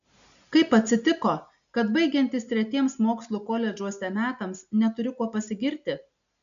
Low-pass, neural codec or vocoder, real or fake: 7.2 kHz; none; real